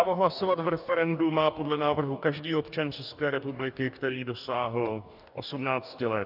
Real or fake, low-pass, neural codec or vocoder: fake; 5.4 kHz; codec, 44.1 kHz, 2.6 kbps, DAC